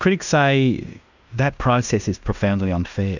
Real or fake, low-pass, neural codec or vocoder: fake; 7.2 kHz; codec, 16 kHz in and 24 kHz out, 0.9 kbps, LongCat-Audio-Codec, fine tuned four codebook decoder